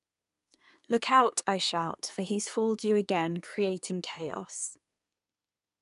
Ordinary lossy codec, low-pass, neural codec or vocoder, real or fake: none; 10.8 kHz; codec, 24 kHz, 1 kbps, SNAC; fake